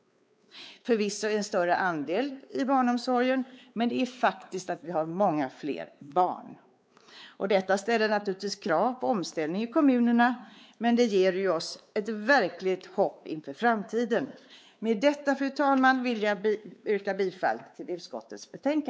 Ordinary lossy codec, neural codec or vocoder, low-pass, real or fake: none; codec, 16 kHz, 4 kbps, X-Codec, WavLM features, trained on Multilingual LibriSpeech; none; fake